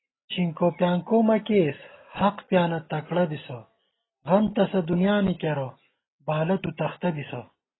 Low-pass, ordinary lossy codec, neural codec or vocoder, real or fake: 7.2 kHz; AAC, 16 kbps; none; real